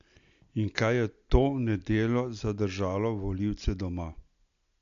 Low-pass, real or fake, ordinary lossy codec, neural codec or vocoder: 7.2 kHz; real; MP3, 64 kbps; none